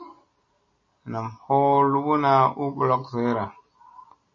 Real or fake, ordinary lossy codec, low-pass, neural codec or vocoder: real; MP3, 32 kbps; 7.2 kHz; none